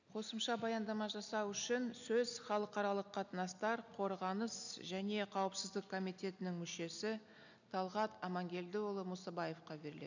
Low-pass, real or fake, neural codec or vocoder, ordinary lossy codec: 7.2 kHz; real; none; none